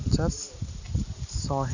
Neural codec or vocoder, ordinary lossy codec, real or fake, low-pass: none; none; real; 7.2 kHz